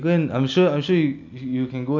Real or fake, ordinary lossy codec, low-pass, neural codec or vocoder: real; none; 7.2 kHz; none